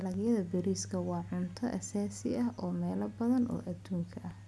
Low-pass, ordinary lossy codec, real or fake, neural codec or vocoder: none; none; real; none